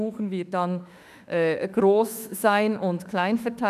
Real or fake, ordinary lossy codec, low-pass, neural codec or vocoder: fake; none; 14.4 kHz; autoencoder, 48 kHz, 32 numbers a frame, DAC-VAE, trained on Japanese speech